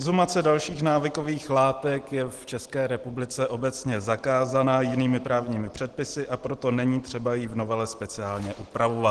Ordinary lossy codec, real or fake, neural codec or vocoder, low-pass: Opus, 16 kbps; real; none; 10.8 kHz